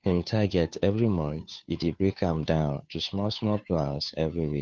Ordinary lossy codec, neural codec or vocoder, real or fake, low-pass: none; codec, 16 kHz, 2 kbps, FunCodec, trained on Chinese and English, 25 frames a second; fake; none